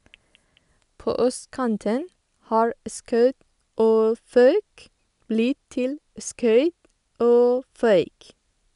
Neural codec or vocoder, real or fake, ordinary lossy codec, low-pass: none; real; none; 10.8 kHz